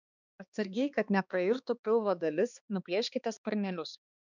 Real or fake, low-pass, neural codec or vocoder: fake; 7.2 kHz; codec, 16 kHz, 2 kbps, X-Codec, HuBERT features, trained on balanced general audio